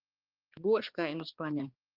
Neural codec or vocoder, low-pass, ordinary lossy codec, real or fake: codec, 16 kHz, 2 kbps, X-Codec, HuBERT features, trained on balanced general audio; 5.4 kHz; Opus, 32 kbps; fake